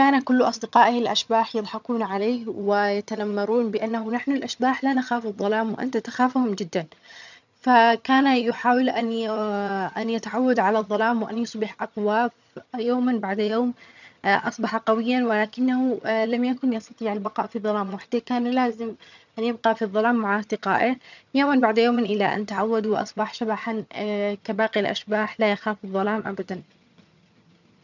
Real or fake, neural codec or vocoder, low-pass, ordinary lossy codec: fake; vocoder, 22.05 kHz, 80 mel bands, HiFi-GAN; 7.2 kHz; none